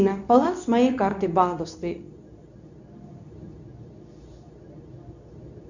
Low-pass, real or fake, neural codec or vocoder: 7.2 kHz; fake; codec, 24 kHz, 0.9 kbps, WavTokenizer, medium speech release version 2